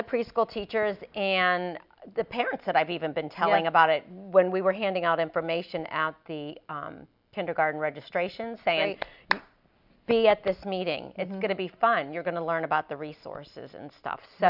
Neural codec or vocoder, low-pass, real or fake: none; 5.4 kHz; real